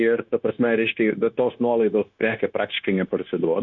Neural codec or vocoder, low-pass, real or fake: codec, 16 kHz, 0.9 kbps, LongCat-Audio-Codec; 7.2 kHz; fake